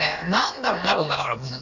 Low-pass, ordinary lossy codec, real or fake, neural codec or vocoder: 7.2 kHz; none; fake; codec, 16 kHz, about 1 kbps, DyCAST, with the encoder's durations